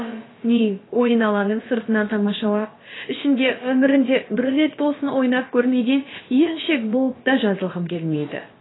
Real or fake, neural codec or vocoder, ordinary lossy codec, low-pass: fake; codec, 16 kHz, about 1 kbps, DyCAST, with the encoder's durations; AAC, 16 kbps; 7.2 kHz